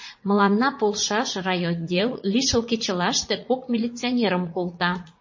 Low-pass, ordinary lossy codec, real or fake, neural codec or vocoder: 7.2 kHz; MP3, 32 kbps; fake; vocoder, 44.1 kHz, 80 mel bands, Vocos